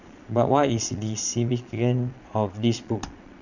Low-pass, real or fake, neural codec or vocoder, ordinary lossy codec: 7.2 kHz; fake; vocoder, 22.05 kHz, 80 mel bands, Vocos; none